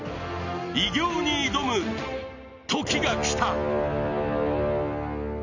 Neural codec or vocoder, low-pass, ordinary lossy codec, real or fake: none; 7.2 kHz; none; real